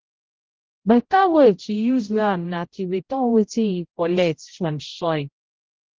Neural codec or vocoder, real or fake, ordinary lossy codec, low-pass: codec, 16 kHz, 0.5 kbps, X-Codec, HuBERT features, trained on general audio; fake; Opus, 16 kbps; 7.2 kHz